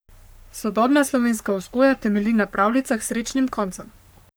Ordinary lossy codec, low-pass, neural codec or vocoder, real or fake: none; none; codec, 44.1 kHz, 3.4 kbps, Pupu-Codec; fake